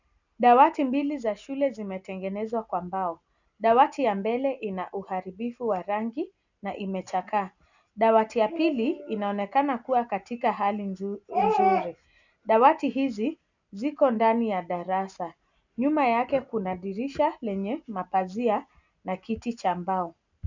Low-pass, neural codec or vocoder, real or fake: 7.2 kHz; none; real